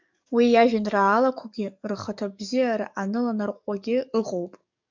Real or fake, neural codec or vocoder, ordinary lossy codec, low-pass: fake; codec, 44.1 kHz, 7.8 kbps, DAC; MP3, 64 kbps; 7.2 kHz